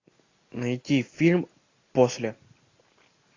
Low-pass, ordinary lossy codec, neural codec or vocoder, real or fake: 7.2 kHz; AAC, 32 kbps; none; real